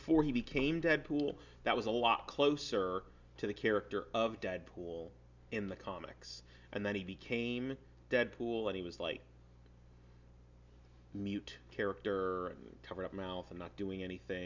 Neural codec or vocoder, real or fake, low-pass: none; real; 7.2 kHz